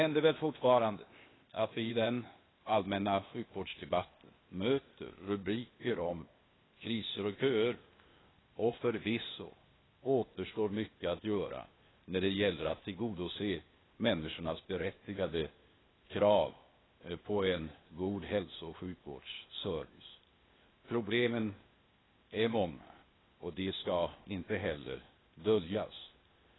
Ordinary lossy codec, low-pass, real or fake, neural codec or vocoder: AAC, 16 kbps; 7.2 kHz; fake; codec, 16 kHz, 0.8 kbps, ZipCodec